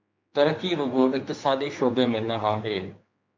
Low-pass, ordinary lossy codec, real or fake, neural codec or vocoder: 7.2 kHz; MP3, 48 kbps; fake; codec, 16 kHz, 4 kbps, X-Codec, HuBERT features, trained on general audio